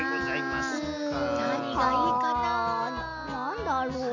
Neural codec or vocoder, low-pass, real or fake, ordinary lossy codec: none; 7.2 kHz; real; none